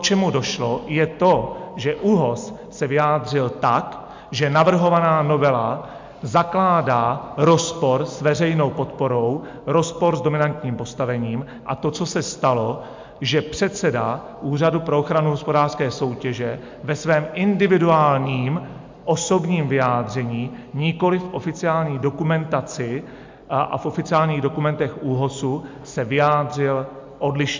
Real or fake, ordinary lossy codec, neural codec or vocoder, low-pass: real; MP3, 64 kbps; none; 7.2 kHz